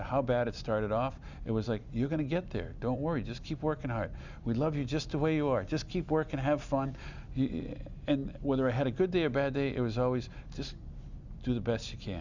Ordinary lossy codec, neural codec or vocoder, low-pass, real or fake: Opus, 64 kbps; none; 7.2 kHz; real